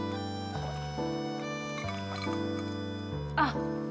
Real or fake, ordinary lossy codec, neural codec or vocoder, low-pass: real; none; none; none